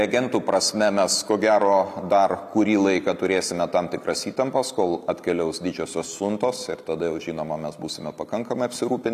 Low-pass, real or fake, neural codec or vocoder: 14.4 kHz; real; none